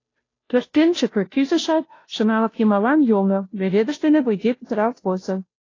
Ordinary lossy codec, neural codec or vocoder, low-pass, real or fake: AAC, 32 kbps; codec, 16 kHz, 0.5 kbps, FunCodec, trained on Chinese and English, 25 frames a second; 7.2 kHz; fake